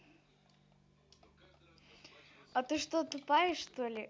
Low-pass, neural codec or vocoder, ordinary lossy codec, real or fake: none; none; none; real